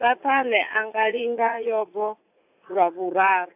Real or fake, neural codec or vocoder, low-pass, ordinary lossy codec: fake; vocoder, 44.1 kHz, 80 mel bands, Vocos; 3.6 kHz; AAC, 32 kbps